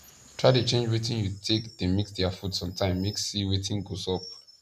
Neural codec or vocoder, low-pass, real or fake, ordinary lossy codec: none; 14.4 kHz; real; none